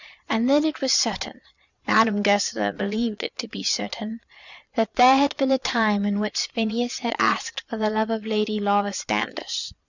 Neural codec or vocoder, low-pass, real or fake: vocoder, 22.05 kHz, 80 mel bands, Vocos; 7.2 kHz; fake